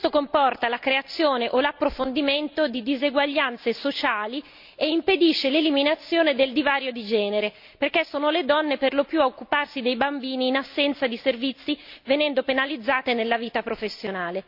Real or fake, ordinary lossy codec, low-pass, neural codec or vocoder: real; MP3, 48 kbps; 5.4 kHz; none